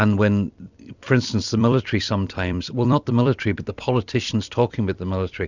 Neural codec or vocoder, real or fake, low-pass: vocoder, 44.1 kHz, 128 mel bands every 256 samples, BigVGAN v2; fake; 7.2 kHz